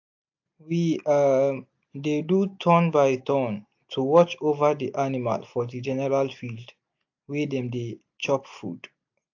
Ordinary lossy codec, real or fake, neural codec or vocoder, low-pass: AAC, 48 kbps; real; none; 7.2 kHz